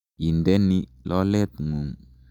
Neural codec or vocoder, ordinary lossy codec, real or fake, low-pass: none; none; real; 19.8 kHz